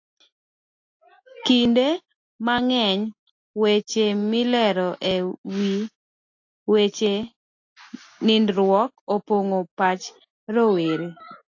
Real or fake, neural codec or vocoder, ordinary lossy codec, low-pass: real; none; AAC, 48 kbps; 7.2 kHz